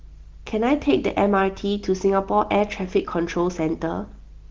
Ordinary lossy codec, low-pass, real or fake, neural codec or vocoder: Opus, 16 kbps; 7.2 kHz; real; none